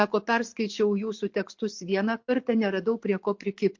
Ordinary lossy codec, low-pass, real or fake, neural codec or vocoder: MP3, 48 kbps; 7.2 kHz; real; none